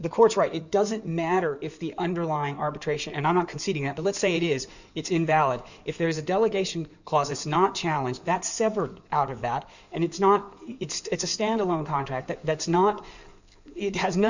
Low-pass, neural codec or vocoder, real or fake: 7.2 kHz; codec, 16 kHz in and 24 kHz out, 2.2 kbps, FireRedTTS-2 codec; fake